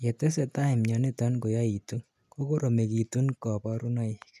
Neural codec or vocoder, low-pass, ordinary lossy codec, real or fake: none; 14.4 kHz; none; real